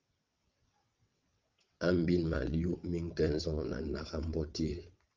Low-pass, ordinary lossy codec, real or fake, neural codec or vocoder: 7.2 kHz; Opus, 24 kbps; fake; vocoder, 22.05 kHz, 80 mel bands, WaveNeXt